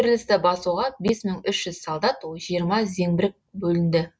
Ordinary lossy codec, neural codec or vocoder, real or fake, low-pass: none; none; real; none